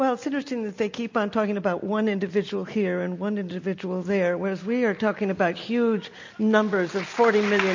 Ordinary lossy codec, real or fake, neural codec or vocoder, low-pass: MP3, 48 kbps; real; none; 7.2 kHz